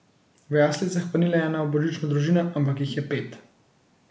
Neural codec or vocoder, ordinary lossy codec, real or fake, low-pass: none; none; real; none